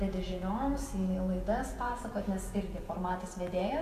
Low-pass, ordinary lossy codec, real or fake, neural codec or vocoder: 14.4 kHz; AAC, 64 kbps; fake; vocoder, 48 kHz, 128 mel bands, Vocos